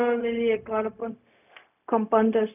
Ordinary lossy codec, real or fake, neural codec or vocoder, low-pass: none; fake; codec, 16 kHz, 0.4 kbps, LongCat-Audio-Codec; 3.6 kHz